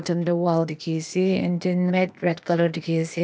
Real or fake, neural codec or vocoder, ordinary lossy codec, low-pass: fake; codec, 16 kHz, 0.8 kbps, ZipCodec; none; none